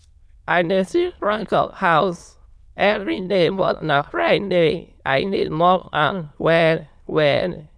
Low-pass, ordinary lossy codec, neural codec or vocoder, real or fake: none; none; autoencoder, 22.05 kHz, a latent of 192 numbers a frame, VITS, trained on many speakers; fake